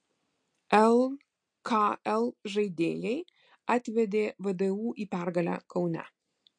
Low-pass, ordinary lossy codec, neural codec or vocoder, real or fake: 9.9 kHz; MP3, 48 kbps; none; real